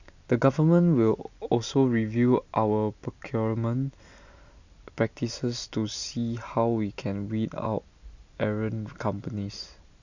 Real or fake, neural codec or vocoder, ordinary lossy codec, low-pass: real; none; none; 7.2 kHz